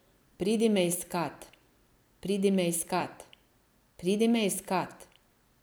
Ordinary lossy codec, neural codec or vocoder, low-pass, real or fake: none; vocoder, 44.1 kHz, 128 mel bands every 256 samples, BigVGAN v2; none; fake